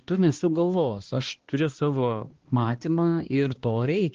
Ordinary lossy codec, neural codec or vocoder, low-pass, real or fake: Opus, 16 kbps; codec, 16 kHz, 1 kbps, X-Codec, HuBERT features, trained on balanced general audio; 7.2 kHz; fake